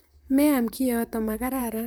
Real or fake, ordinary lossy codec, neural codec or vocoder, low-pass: fake; none; vocoder, 44.1 kHz, 128 mel bands, Pupu-Vocoder; none